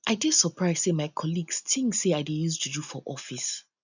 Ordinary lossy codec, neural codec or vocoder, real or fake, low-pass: none; none; real; 7.2 kHz